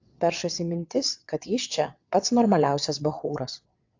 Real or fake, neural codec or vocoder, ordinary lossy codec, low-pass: fake; vocoder, 22.05 kHz, 80 mel bands, WaveNeXt; AAC, 48 kbps; 7.2 kHz